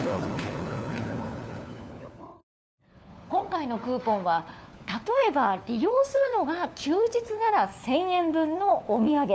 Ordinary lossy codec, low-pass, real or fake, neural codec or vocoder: none; none; fake; codec, 16 kHz, 4 kbps, FunCodec, trained on LibriTTS, 50 frames a second